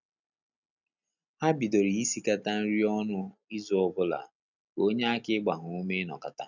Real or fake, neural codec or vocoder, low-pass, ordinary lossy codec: real; none; 7.2 kHz; none